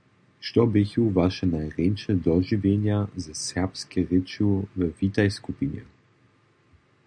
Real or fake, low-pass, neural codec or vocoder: real; 9.9 kHz; none